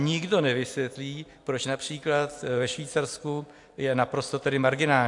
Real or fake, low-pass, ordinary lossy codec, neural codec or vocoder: real; 10.8 kHz; AAC, 64 kbps; none